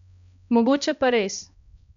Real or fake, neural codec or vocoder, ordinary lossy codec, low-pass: fake; codec, 16 kHz, 1 kbps, X-Codec, HuBERT features, trained on balanced general audio; none; 7.2 kHz